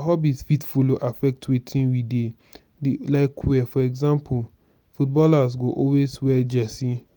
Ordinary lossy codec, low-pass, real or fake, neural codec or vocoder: none; none; real; none